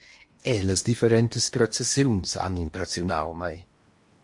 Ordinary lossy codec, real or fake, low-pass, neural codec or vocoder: MP3, 48 kbps; fake; 10.8 kHz; codec, 16 kHz in and 24 kHz out, 0.8 kbps, FocalCodec, streaming, 65536 codes